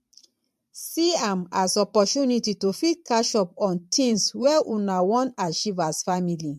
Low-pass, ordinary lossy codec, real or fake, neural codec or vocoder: 14.4 kHz; MP3, 64 kbps; fake; vocoder, 44.1 kHz, 128 mel bands every 512 samples, BigVGAN v2